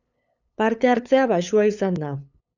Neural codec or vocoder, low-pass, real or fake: codec, 16 kHz, 8 kbps, FunCodec, trained on LibriTTS, 25 frames a second; 7.2 kHz; fake